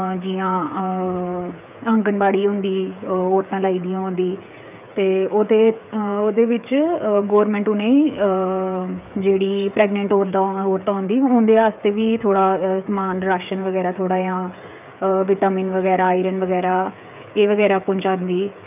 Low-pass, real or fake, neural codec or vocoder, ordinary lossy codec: 3.6 kHz; fake; codec, 16 kHz, 8 kbps, FreqCodec, smaller model; none